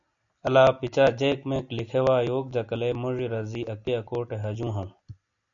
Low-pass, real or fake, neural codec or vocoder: 7.2 kHz; real; none